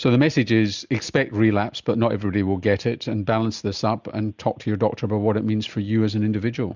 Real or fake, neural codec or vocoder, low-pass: real; none; 7.2 kHz